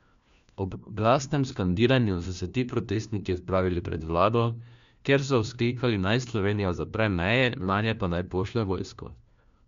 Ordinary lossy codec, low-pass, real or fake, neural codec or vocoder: MP3, 64 kbps; 7.2 kHz; fake; codec, 16 kHz, 1 kbps, FunCodec, trained on LibriTTS, 50 frames a second